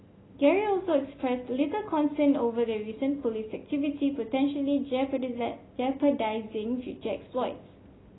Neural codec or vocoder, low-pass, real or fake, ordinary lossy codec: none; 7.2 kHz; real; AAC, 16 kbps